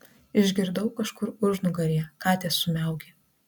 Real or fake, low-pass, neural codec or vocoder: real; 19.8 kHz; none